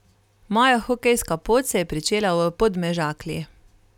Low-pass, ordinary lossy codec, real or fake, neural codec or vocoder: 19.8 kHz; none; real; none